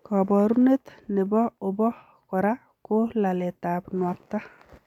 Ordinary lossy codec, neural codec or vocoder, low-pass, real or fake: none; none; 19.8 kHz; real